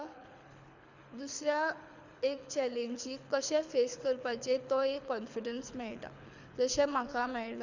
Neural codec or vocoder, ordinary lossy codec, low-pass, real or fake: codec, 24 kHz, 6 kbps, HILCodec; Opus, 64 kbps; 7.2 kHz; fake